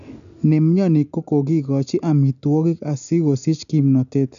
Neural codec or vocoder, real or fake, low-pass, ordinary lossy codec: none; real; 7.2 kHz; none